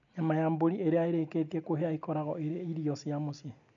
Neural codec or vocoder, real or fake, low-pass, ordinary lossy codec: none; real; 7.2 kHz; none